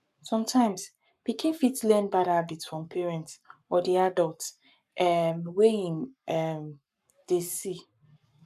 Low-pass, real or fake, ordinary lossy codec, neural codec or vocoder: 14.4 kHz; fake; none; codec, 44.1 kHz, 7.8 kbps, Pupu-Codec